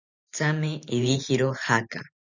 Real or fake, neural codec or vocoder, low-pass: fake; vocoder, 44.1 kHz, 128 mel bands every 512 samples, BigVGAN v2; 7.2 kHz